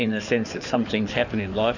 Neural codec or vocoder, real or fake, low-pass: codec, 16 kHz in and 24 kHz out, 2.2 kbps, FireRedTTS-2 codec; fake; 7.2 kHz